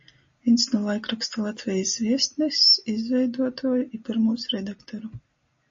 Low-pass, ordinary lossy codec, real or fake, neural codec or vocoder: 7.2 kHz; MP3, 32 kbps; real; none